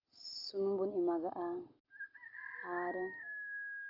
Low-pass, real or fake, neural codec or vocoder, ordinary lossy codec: 5.4 kHz; real; none; Opus, 32 kbps